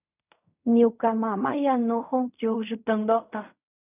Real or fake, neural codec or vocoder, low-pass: fake; codec, 16 kHz in and 24 kHz out, 0.4 kbps, LongCat-Audio-Codec, fine tuned four codebook decoder; 3.6 kHz